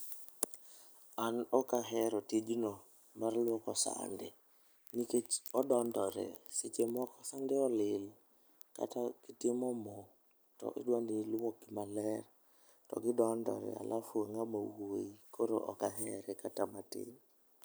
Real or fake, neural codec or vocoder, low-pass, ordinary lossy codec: real; none; none; none